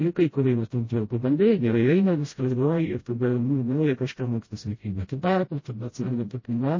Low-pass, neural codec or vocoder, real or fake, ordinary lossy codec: 7.2 kHz; codec, 16 kHz, 0.5 kbps, FreqCodec, smaller model; fake; MP3, 32 kbps